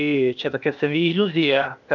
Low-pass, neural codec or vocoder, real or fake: 7.2 kHz; codec, 16 kHz, 0.8 kbps, ZipCodec; fake